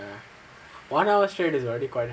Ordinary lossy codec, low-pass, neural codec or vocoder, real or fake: none; none; none; real